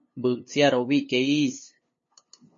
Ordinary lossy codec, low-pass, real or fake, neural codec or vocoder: MP3, 32 kbps; 7.2 kHz; fake; codec, 16 kHz, 2 kbps, FunCodec, trained on LibriTTS, 25 frames a second